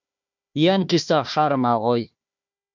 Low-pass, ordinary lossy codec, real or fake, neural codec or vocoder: 7.2 kHz; MP3, 64 kbps; fake; codec, 16 kHz, 1 kbps, FunCodec, trained on Chinese and English, 50 frames a second